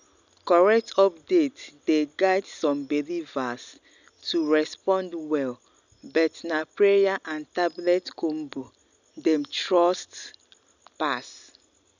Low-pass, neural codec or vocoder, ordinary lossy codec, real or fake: 7.2 kHz; none; none; real